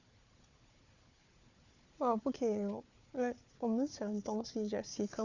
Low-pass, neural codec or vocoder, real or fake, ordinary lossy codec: 7.2 kHz; codec, 16 kHz, 4 kbps, FunCodec, trained on Chinese and English, 50 frames a second; fake; Opus, 64 kbps